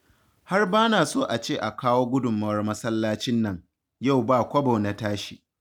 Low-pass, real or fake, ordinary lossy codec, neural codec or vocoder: 19.8 kHz; real; none; none